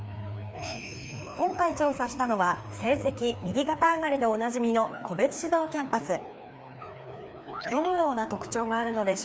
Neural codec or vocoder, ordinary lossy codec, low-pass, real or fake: codec, 16 kHz, 2 kbps, FreqCodec, larger model; none; none; fake